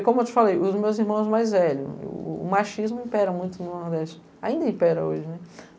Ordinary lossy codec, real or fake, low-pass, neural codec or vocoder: none; real; none; none